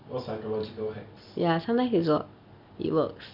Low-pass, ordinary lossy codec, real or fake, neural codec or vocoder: 5.4 kHz; none; real; none